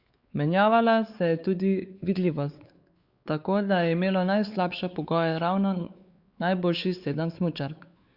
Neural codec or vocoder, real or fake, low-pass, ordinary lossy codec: codec, 16 kHz, 4 kbps, X-Codec, WavLM features, trained on Multilingual LibriSpeech; fake; 5.4 kHz; Opus, 64 kbps